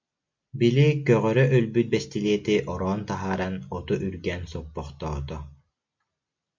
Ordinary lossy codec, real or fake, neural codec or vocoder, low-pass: MP3, 64 kbps; real; none; 7.2 kHz